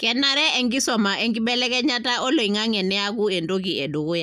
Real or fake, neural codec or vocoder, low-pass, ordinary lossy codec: real; none; 14.4 kHz; none